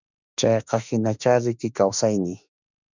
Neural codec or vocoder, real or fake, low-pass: autoencoder, 48 kHz, 32 numbers a frame, DAC-VAE, trained on Japanese speech; fake; 7.2 kHz